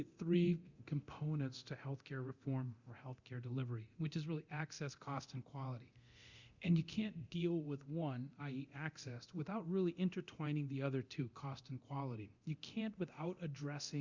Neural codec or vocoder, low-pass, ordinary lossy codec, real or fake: codec, 24 kHz, 0.9 kbps, DualCodec; 7.2 kHz; Opus, 64 kbps; fake